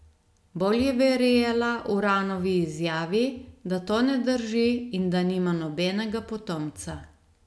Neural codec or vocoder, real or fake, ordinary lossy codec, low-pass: none; real; none; none